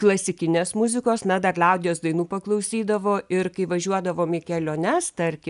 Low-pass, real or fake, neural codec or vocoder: 10.8 kHz; real; none